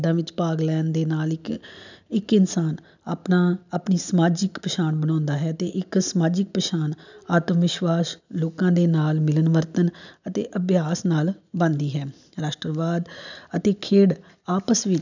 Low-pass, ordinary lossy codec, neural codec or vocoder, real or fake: 7.2 kHz; none; none; real